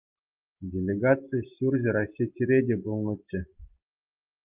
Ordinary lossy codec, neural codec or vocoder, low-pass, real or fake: Opus, 32 kbps; none; 3.6 kHz; real